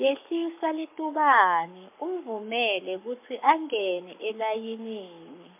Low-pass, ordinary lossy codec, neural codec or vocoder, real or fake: 3.6 kHz; MP3, 32 kbps; codec, 44.1 kHz, 7.8 kbps, Pupu-Codec; fake